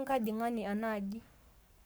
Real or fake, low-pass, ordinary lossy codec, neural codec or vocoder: fake; none; none; codec, 44.1 kHz, 7.8 kbps, Pupu-Codec